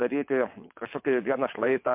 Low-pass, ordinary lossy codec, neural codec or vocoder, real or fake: 3.6 kHz; MP3, 32 kbps; vocoder, 22.05 kHz, 80 mel bands, WaveNeXt; fake